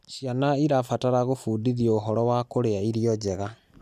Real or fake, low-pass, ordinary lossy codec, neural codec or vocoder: real; 14.4 kHz; none; none